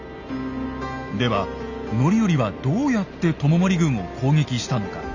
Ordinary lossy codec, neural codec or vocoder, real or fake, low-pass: none; none; real; 7.2 kHz